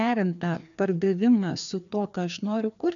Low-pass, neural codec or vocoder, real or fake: 7.2 kHz; codec, 16 kHz, 2 kbps, FreqCodec, larger model; fake